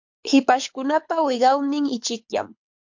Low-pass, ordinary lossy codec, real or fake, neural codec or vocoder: 7.2 kHz; MP3, 48 kbps; fake; codec, 24 kHz, 6 kbps, HILCodec